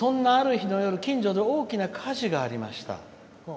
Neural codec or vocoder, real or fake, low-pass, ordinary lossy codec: none; real; none; none